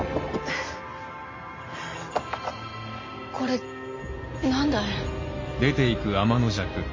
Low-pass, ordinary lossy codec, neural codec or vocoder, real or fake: 7.2 kHz; MP3, 32 kbps; none; real